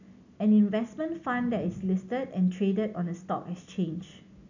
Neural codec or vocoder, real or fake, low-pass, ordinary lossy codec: none; real; 7.2 kHz; none